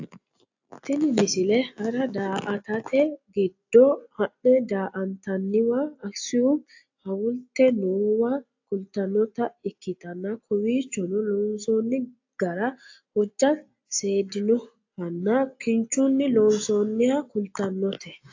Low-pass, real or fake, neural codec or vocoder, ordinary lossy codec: 7.2 kHz; real; none; AAC, 48 kbps